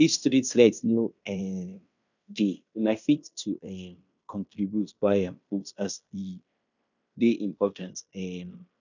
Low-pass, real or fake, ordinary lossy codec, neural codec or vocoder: 7.2 kHz; fake; none; codec, 16 kHz in and 24 kHz out, 0.9 kbps, LongCat-Audio-Codec, fine tuned four codebook decoder